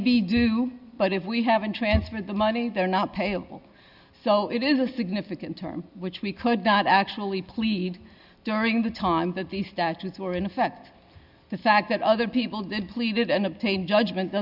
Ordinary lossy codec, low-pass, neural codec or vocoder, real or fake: Opus, 64 kbps; 5.4 kHz; none; real